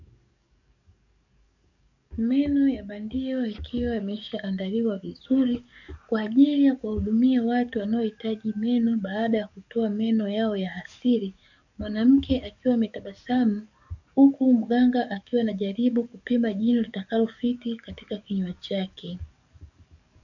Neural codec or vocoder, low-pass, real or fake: codec, 44.1 kHz, 7.8 kbps, DAC; 7.2 kHz; fake